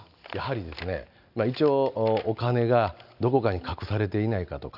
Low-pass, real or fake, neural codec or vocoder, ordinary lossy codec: 5.4 kHz; real; none; none